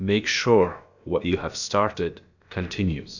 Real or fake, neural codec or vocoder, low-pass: fake; codec, 16 kHz, about 1 kbps, DyCAST, with the encoder's durations; 7.2 kHz